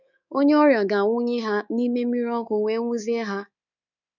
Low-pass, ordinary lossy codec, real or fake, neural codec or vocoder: 7.2 kHz; none; fake; codec, 24 kHz, 3.1 kbps, DualCodec